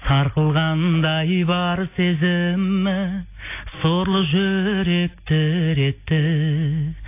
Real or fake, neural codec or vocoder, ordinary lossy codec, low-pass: real; none; AAC, 24 kbps; 3.6 kHz